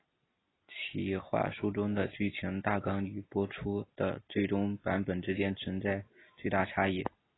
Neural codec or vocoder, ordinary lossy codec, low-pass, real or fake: none; AAC, 16 kbps; 7.2 kHz; real